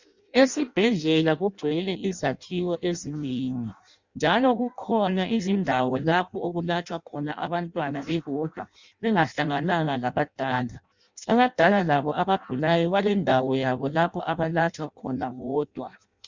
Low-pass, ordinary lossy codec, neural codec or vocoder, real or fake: 7.2 kHz; Opus, 64 kbps; codec, 16 kHz in and 24 kHz out, 0.6 kbps, FireRedTTS-2 codec; fake